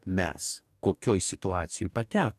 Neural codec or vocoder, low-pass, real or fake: codec, 44.1 kHz, 2.6 kbps, DAC; 14.4 kHz; fake